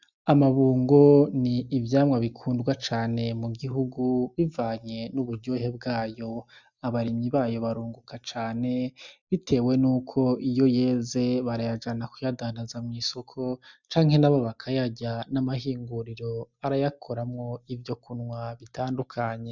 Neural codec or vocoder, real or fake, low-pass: none; real; 7.2 kHz